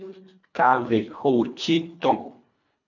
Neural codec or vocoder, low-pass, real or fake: codec, 24 kHz, 1.5 kbps, HILCodec; 7.2 kHz; fake